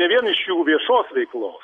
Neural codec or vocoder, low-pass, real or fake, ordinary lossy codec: none; 10.8 kHz; real; MP3, 96 kbps